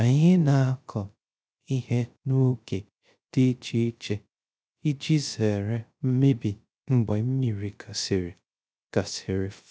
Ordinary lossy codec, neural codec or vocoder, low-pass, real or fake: none; codec, 16 kHz, 0.3 kbps, FocalCodec; none; fake